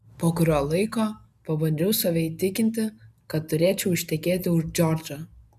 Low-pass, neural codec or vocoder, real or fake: 14.4 kHz; none; real